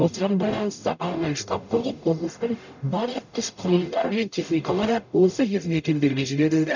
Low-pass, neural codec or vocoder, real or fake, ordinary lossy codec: 7.2 kHz; codec, 44.1 kHz, 0.9 kbps, DAC; fake; none